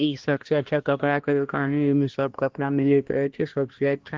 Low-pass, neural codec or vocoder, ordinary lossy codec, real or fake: 7.2 kHz; codec, 16 kHz, 1 kbps, X-Codec, HuBERT features, trained on balanced general audio; Opus, 16 kbps; fake